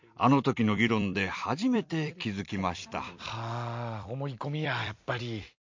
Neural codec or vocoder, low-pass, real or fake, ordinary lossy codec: vocoder, 44.1 kHz, 128 mel bands every 256 samples, BigVGAN v2; 7.2 kHz; fake; MP3, 48 kbps